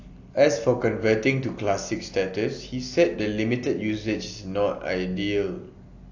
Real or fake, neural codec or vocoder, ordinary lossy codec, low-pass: real; none; AAC, 48 kbps; 7.2 kHz